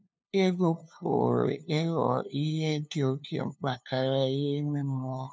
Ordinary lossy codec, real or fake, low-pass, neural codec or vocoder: none; fake; none; codec, 16 kHz, 2 kbps, FunCodec, trained on LibriTTS, 25 frames a second